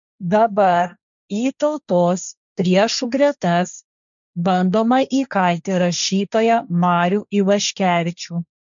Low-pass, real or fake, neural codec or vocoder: 7.2 kHz; fake; codec, 16 kHz, 1.1 kbps, Voila-Tokenizer